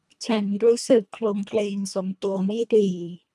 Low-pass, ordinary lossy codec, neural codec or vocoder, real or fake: none; none; codec, 24 kHz, 1.5 kbps, HILCodec; fake